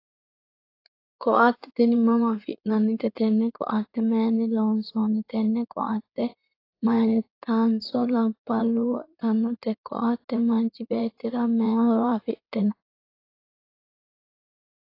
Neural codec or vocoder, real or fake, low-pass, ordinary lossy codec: codec, 16 kHz in and 24 kHz out, 2.2 kbps, FireRedTTS-2 codec; fake; 5.4 kHz; AAC, 32 kbps